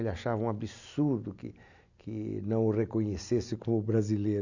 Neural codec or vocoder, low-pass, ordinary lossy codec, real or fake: none; 7.2 kHz; none; real